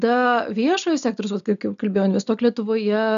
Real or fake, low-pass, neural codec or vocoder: real; 7.2 kHz; none